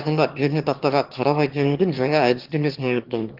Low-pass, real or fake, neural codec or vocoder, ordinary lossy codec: 5.4 kHz; fake; autoencoder, 22.05 kHz, a latent of 192 numbers a frame, VITS, trained on one speaker; Opus, 16 kbps